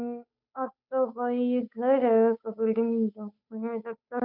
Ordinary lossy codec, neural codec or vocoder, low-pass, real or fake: none; codec, 16 kHz, 8 kbps, FunCodec, trained on Chinese and English, 25 frames a second; 5.4 kHz; fake